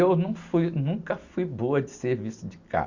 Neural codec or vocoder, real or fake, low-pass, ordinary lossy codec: none; real; 7.2 kHz; Opus, 64 kbps